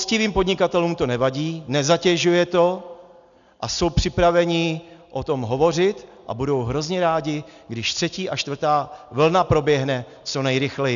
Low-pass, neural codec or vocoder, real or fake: 7.2 kHz; none; real